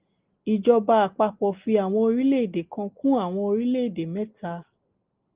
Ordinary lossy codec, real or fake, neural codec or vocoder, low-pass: Opus, 32 kbps; real; none; 3.6 kHz